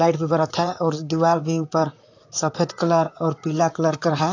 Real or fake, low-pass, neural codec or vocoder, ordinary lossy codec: fake; 7.2 kHz; vocoder, 44.1 kHz, 128 mel bands, Pupu-Vocoder; AAC, 48 kbps